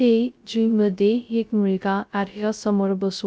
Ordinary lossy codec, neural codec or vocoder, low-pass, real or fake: none; codec, 16 kHz, 0.2 kbps, FocalCodec; none; fake